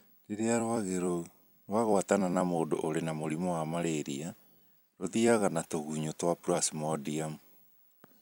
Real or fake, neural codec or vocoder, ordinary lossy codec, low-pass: fake; vocoder, 44.1 kHz, 128 mel bands every 256 samples, BigVGAN v2; none; none